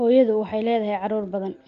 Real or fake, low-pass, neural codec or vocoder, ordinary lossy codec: real; 7.2 kHz; none; Opus, 32 kbps